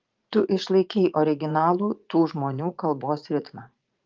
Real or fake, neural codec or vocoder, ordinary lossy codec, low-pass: fake; vocoder, 44.1 kHz, 80 mel bands, Vocos; Opus, 32 kbps; 7.2 kHz